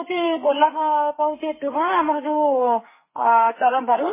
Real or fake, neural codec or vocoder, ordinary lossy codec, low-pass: fake; codec, 32 kHz, 1.9 kbps, SNAC; MP3, 16 kbps; 3.6 kHz